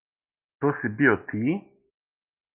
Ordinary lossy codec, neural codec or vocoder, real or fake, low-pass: Opus, 24 kbps; none; real; 3.6 kHz